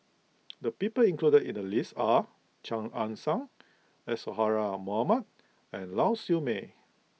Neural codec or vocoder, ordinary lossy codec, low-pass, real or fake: none; none; none; real